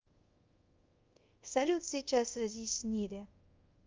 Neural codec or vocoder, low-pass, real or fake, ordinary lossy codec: codec, 24 kHz, 0.5 kbps, DualCodec; 7.2 kHz; fake; Opus, 24 kbps